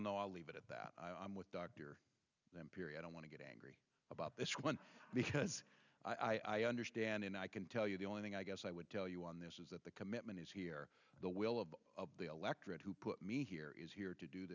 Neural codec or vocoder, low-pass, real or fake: none; 7.2 kHz; real